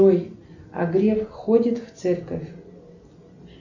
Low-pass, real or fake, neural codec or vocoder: 7.2 kHz; real; none